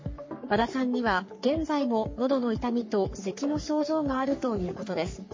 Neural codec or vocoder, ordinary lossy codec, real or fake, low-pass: codec, 44.1 kHz, 3.4 kbps, Pupu-Codec; MP3, 32 kbps; fake; 7.2 kHz